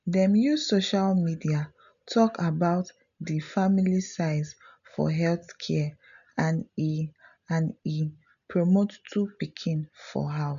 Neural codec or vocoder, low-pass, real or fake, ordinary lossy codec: none; 7.2 kHz; real; none